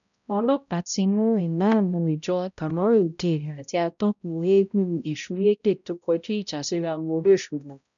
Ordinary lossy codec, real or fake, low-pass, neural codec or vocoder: none; fake; 7.2 kHz; codec, 16 kHz, 0.5 kbps, X-Codec, HuBERT features, trained on balanced general audio